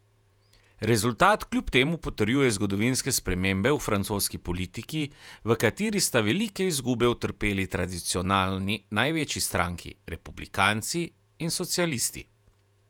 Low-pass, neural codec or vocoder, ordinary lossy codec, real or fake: 19.8 kHz; vocoder, 48 kHz, 128 mel bands, Vocos; none; fake